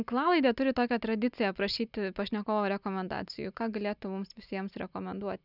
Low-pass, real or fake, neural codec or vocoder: 5.4 kHz; real; none